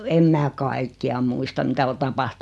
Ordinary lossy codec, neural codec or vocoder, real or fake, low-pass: none; none; real; none